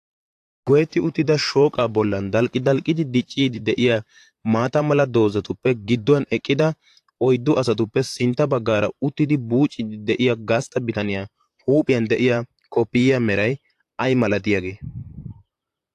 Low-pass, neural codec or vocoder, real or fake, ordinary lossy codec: 14.4 kHz; vocoder, 44.1 kHz, 128 mel bands, Pupu-Vocoder; fake; AAC, 64 kbps